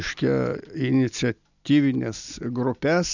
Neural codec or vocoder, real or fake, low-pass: none; real; 7.2 kHz